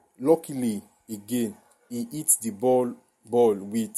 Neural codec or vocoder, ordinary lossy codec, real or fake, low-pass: none; MP3, 64 kbps; real; 19.8 kHz